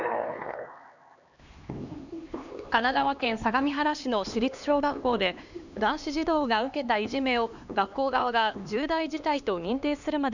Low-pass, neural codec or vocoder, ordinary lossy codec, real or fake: 7.2 kHz; codec, 16 kHz, 2 kbps, X-Codec, HuBERT features, trained on LibriSpeech; none; fake